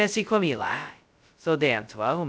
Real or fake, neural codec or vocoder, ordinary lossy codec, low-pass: fake; codec, 16 kHz, 0.2 kbps, FocalCodec; none; none